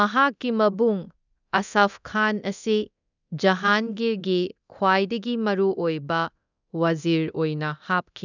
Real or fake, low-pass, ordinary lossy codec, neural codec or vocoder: fake; 7.2 kHz; none; codec, 24 kHz, 0.9 kbps, DualCodec